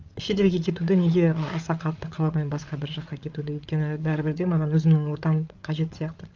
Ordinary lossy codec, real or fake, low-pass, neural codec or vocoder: Opus, 24 kbps; fake; 7.2 kHz; codec, 16 kHz, 8 kbps, FreqCodec, larger model